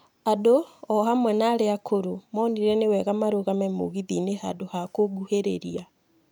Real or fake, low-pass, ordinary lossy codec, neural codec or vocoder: real; none; none; none